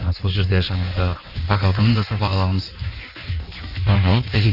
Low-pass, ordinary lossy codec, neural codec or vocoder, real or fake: 5.4 kHz; none; codec, 16 kHz in and 24 kHz out, 1.1 kbps, FireRedTTS-2 codec; fake